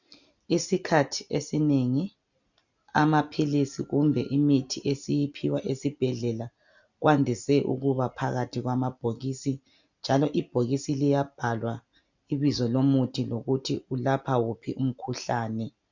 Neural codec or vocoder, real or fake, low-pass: none; real; 7.2 kHz